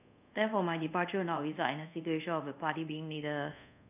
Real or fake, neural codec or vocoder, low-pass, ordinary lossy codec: fake; codec, 24 kHz, 0.5 kbps, DualCodec; 3.6 kHz; none